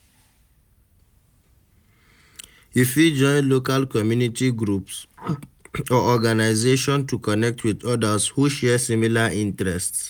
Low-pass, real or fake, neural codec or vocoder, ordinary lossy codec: none; real; none; none